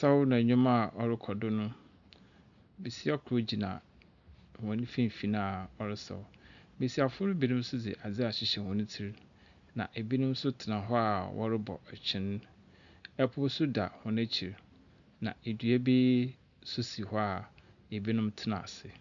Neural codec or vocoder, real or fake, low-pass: none; real; 7.2 kHz